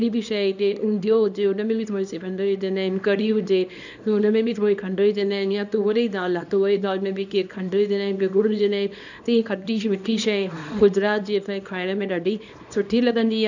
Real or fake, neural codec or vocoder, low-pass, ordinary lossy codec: fake; codec, 24 kHz, 0.9 kbps, WavTokenizer, small release; 7.2 kHz; none